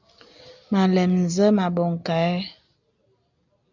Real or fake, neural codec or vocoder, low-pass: real; none; 7.2 kHz